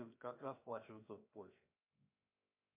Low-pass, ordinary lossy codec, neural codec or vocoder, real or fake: 3.6 kHz; MP3, 32 kbps; codec, 16 kHz, 0.7 kbps, FocalCodec; fake